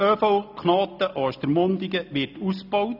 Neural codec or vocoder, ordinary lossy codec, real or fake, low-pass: none; none; real; 5.4 kHz